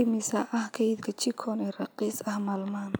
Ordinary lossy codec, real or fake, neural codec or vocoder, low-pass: none; real; none; none